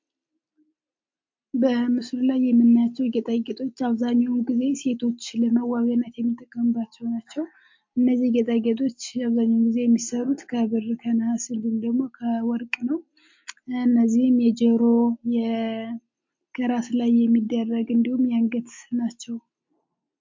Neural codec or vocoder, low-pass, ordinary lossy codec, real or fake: none; 7.2 kHz; MP3, 48 kbps; real